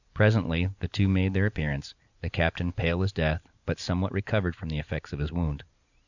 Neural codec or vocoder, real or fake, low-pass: none; real; 7.2 kHz